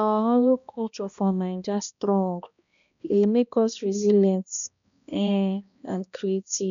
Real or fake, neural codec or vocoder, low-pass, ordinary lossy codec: fake; codec, 16 kHz, 1 kbps, X-Codec, HuBERT features, trained on balanced general audio; 7.2 kHz; none